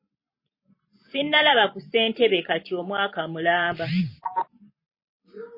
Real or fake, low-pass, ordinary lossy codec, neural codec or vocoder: fake; 5.4 kHz; MP3, 24 kbps; vocoder, 44.1 kHz, 128 mel bands every 512 samples, BigVGAN v2